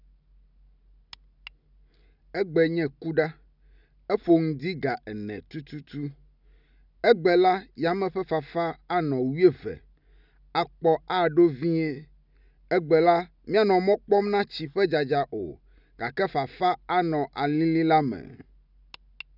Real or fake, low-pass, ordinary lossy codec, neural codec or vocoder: real; 5.4 kHz; none; none